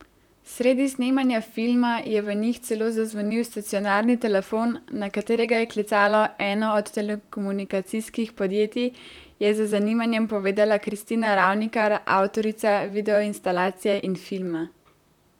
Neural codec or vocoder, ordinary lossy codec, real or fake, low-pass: vocoder, 44.1 kHz, 128 mel bands, Pupu-Vocoder; none; fake; 19.8 kHz